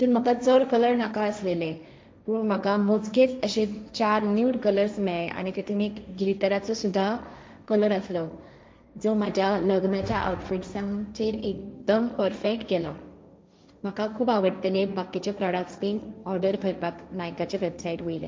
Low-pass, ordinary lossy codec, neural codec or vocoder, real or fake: none; none; codec, 16 kHz, 1.1 kbps, Voila-Tokenizer; fake